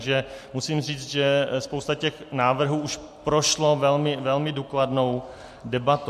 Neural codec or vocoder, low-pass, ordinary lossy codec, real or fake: none; 14.4 kHz; MP3, 64 kbps; real